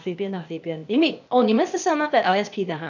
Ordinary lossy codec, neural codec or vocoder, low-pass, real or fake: none; codec, 16 kHz, 0.8 kbps, ZipCodec; 7.2 kHz; fake